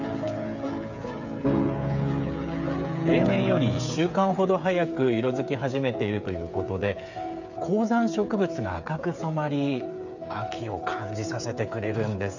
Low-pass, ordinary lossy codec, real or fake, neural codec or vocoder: 7.2 kHz; none; fake; codec, 16 kHz, 8 kbps, FreqCodec, smaller model